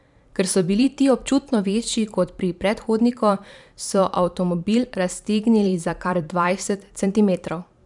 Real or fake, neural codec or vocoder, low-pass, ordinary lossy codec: fake; vocoder, 44.1 kHz, 128 mel bands every 512 samples, BigVGAN v2; 10.8 kHz; none